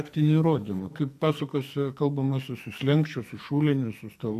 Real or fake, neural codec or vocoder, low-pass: fake; codec, 44.1 kHz, 2.6 kbps, SNAC; 14.4 kHz